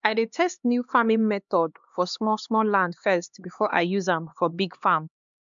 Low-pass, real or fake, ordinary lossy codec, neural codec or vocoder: 7.2 kHz; fake; MP3, 64 kbps; codec, 16 kHz, 2 kbps, X-Codec, HuBERT features, trained on LibriSpeech